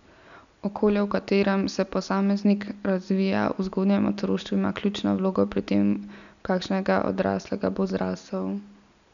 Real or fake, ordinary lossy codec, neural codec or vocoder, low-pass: real; none; none; 7.2 kHz